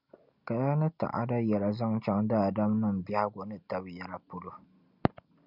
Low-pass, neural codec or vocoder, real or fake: 5.4 kHz; none; real